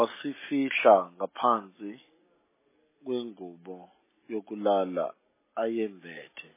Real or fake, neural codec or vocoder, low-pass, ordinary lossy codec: real; none; 3.6 kHz; MP3, 16 kbps